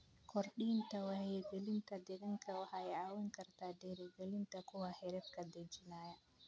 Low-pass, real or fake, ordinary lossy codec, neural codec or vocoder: none; real; none; none